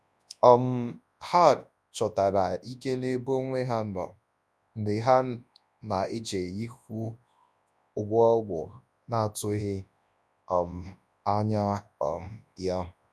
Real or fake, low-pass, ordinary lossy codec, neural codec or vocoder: fake; none; none; codec, 24 kHz, 0.9 kbps, WavTokenizer, large speech release